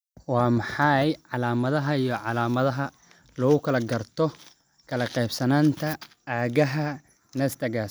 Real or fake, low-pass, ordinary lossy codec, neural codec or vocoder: real; none; none; none